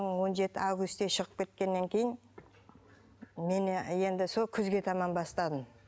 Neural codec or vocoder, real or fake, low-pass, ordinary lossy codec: none; real; none; none